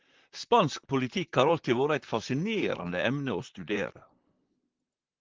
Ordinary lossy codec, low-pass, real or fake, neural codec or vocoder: Opus, 16 kbps; 7.2 kHz; fake; vocoder, 44.1 kHz, 128 mel bands, Pupu-Vocoder